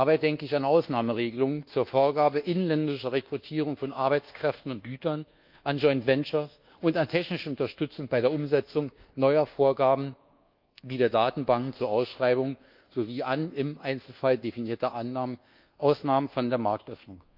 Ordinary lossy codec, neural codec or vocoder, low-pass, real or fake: Opus, 24 kbps; codec, 24 kHz, 1.2 kbps, DualCodec; 5.4 kHz; fake